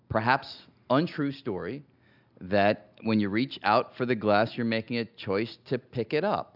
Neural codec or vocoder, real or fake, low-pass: none; real; 5.4 kHz